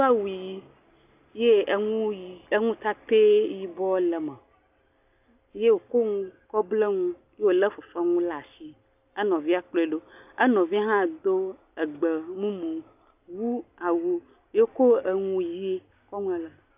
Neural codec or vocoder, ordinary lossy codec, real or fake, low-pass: none; AAC, 32 kbps; real; 3.6 kHz